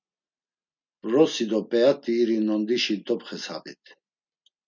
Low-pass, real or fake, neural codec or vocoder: 7.2 kHz; real; none